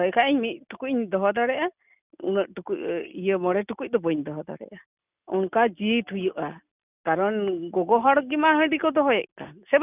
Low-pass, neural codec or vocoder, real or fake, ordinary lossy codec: 3.6 kHz; none; real; none